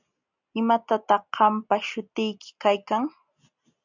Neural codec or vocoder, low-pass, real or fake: none; 7.2 kHz; real